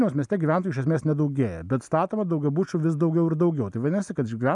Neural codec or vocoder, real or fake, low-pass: none; real; 10.8 kHz